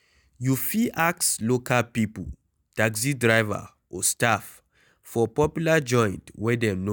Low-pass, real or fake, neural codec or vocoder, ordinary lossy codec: none; real; none; none